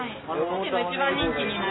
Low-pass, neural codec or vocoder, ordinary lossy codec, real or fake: 7.2 kHz; none; AAC, 16 kbps; real